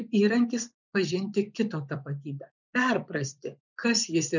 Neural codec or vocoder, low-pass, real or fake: vocoder, 24 kHz, 100 mel bands, Vocos; 7.2 kHz; fake